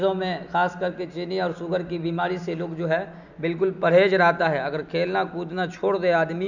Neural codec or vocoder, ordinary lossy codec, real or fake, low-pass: none; none; real; 7.2 kHz